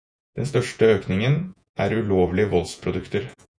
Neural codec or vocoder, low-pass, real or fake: vocoder, 48 kHz, 128 mel bands, Vocos; 9.9 kHz; fake